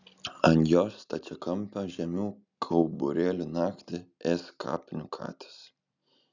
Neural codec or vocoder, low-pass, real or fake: none; 7.2 kHz; real